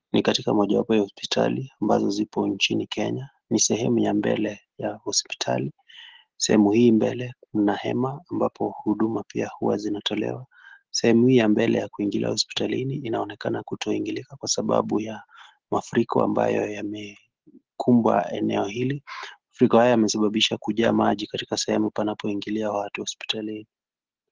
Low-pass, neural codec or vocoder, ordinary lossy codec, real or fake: 7.2 kHz; none; Opus, 16 kbps; real